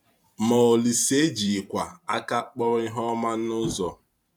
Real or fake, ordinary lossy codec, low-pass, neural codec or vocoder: fake; none; 19.8 kHz; vocoder, 44.1 kHz, 128 mel bands every 512 samples, BigVGAN v2